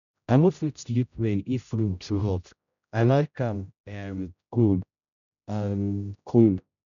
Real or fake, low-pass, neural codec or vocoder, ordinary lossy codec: fake; 7.2 kHz; codec, 16 kHz, 0.5 kbps, X-Codec, HuBERT features, trained on general audio; MP3, 96 kbps